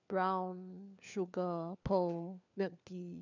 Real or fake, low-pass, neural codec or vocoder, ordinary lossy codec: fake; 7.2 kHz; codec, 16 kHz, 4 kbps, FunCodec, trained on LibriTTS, 50 frames a second; none